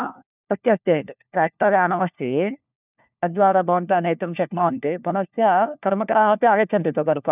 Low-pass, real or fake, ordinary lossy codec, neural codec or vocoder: 3.6 kHz; fake; none; codec, 16 kHz, 1 kbps, FunCodec, trained on LibriTTS, 50 frames a second